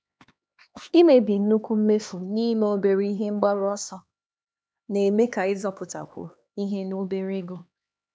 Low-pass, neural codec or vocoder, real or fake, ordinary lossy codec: none; codec, 16 kHz, 2 kbps, X-Codec, HuBERT features, trained on LibriSpeech; fake; none